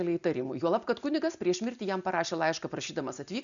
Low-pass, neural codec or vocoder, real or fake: 7.2 kHz; none; real